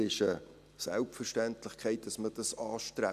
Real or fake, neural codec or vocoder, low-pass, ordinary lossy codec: real; none; 14.4 kHz; none